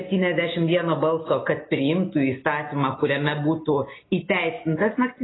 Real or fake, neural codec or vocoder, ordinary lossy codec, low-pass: real; none; AAC, 16 kbps; 7.2 kHz